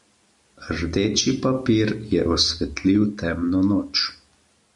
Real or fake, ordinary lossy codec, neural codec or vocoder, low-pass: real; MP3, 96 kbps; none; 10.8 kHz